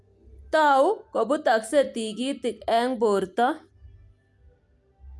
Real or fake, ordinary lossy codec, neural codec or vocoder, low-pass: real; none; none; none